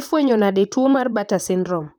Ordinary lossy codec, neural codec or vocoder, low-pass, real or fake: none; vocoder, 44.1 kHz, 128 mel bands, Pupu-Vocoder; none; fake